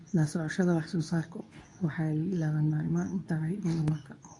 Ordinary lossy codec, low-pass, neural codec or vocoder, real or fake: AAC, 48 kbps; 10.8 kHz; codec, 24 kHz, 0.9 kbps, WavTokenizer, medium speech release version 2; fake